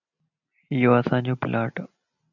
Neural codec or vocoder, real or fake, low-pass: none; real; 7.2 kHz